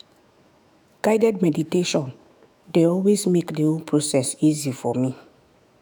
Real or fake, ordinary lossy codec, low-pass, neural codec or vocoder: fake; none; none; autoencoder, 48 kHz, 128 numbers a frame, DAC-VAE, trained on Japanese speech